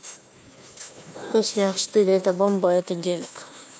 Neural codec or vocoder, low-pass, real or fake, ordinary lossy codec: codec, 16 kHz, 1 kbps, FunCodec, trained on Chinese and English, 50 frames a second; none; fake; none